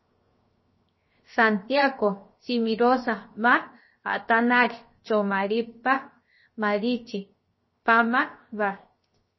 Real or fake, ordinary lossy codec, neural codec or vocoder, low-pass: fake; MP3, 24 kbps; codec, 16 kHz, 0.7 kbps, FocalCodec; 7.2 kHz